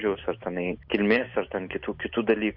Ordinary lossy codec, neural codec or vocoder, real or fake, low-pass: MP3, 48 kbps; none; real; 5.4 kHz